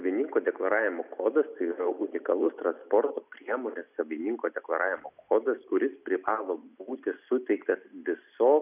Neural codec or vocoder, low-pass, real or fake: none; 3.6 kHz; real